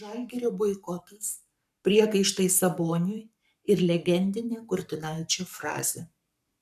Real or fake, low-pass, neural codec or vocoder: fake; 14.4 kHz; codec, 44.1 kHz, 7.8 kbps, Pupu-Codec